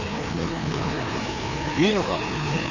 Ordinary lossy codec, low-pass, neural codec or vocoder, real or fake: none; 7.2 kHz; codec, 16 kHz, 2 kbps, FreqCodec, larger model; fake